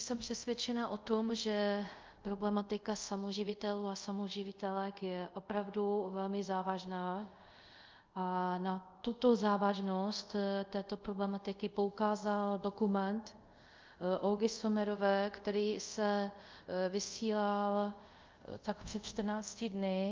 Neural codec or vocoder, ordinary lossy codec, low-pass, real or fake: codec, 24 kHz, 0.5 kbps, DualCodec; Opus, 24 kbps; 7.2 kHz; fake